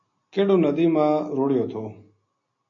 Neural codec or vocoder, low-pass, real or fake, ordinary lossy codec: none; 7.2 kHz; real; AAC, 48 kbps